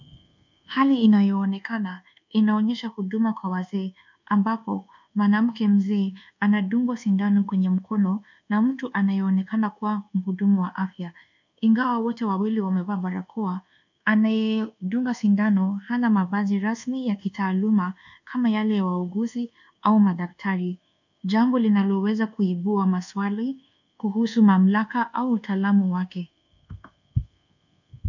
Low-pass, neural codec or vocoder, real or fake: 7.2 kHz; codec, 24 kHz, 1.2 kbps, DualCodec; fake